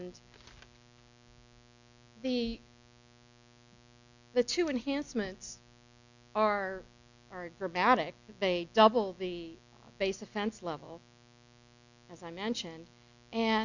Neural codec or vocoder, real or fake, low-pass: none; real; 7.2 kHz